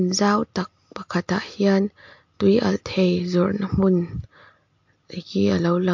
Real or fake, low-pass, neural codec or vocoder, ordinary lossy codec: real; 7.2 kHz; none; MP3, 48 kbps